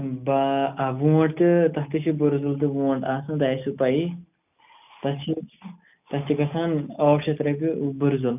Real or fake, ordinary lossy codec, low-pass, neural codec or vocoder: real; none; 3.6 kHz; none